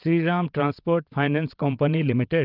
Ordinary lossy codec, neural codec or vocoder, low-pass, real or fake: Opus, 32 kbps; vocoder, 22.05 kHz, 80 mel bands, WaveNeXt; 5.4 kHz; fake